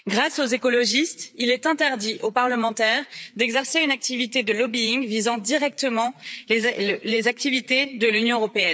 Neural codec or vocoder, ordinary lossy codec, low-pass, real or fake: codec, 16 kHz, 4 kbps, FreqCodec, larger model; none; none; fake